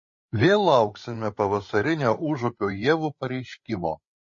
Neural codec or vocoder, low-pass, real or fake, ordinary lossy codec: codec, 16 kHz, 16 kbps, FreqCodec, larger model; 7.2 kHz; fake; MP3, 32 kbps